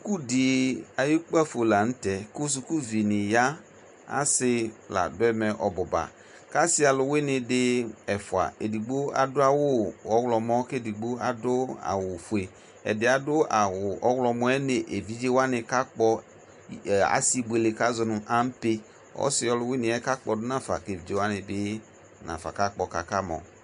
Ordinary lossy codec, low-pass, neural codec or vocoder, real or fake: MP3, 64 kbps; 10.8 kHz; none; real